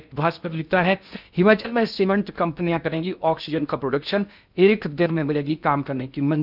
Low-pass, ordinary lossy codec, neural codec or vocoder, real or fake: 5.4 kHz; none; codec, 16 kHz in and 24 kHz out, 0.6 kbps, FocalCodec, streaming, 2048 codes; fake